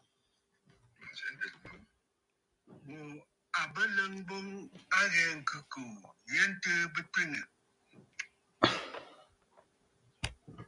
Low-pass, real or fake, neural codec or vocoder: 10.8 kHz; real; none